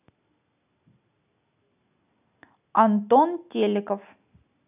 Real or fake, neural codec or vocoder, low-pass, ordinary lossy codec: real; none; 3.6 kHz; none